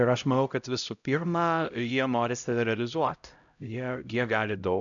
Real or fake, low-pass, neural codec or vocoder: fake; 7.2 kHz; codec, 16 kHz, 0.5 kbps, X-Codec, HuBERT features, trained on LibriSpeech